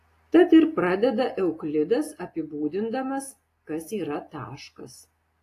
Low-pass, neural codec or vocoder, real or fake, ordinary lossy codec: 14.4 kHz; none; real; AAC, 48 kbps